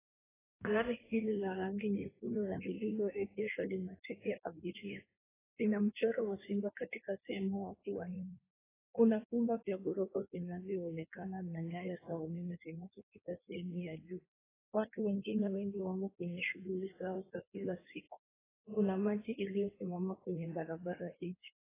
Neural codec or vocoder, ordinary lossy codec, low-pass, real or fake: codec, 16 kHz in and 24 kHz out, 1.1 kbps, FireRedTTS-2 codec; AAC, 16 kbps; 3.6 kHz; fake